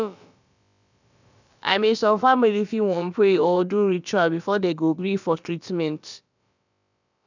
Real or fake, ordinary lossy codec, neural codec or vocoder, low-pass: fake; none; codec, 16 kHz, about 1 kbps, DyCAST, with the encoder's durations; 7.2 kHz